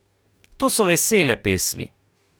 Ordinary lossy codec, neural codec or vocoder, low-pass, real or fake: none; codec, 44.1 kHz, 2.6 kbps, DAC; none; fake